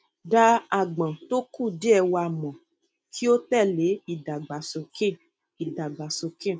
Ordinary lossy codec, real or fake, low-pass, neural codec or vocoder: none; real; none; none